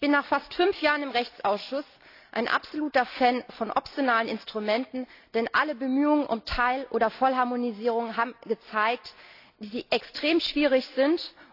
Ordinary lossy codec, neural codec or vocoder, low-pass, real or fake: AAC, 32 kbps; none; 5.4 kHz; real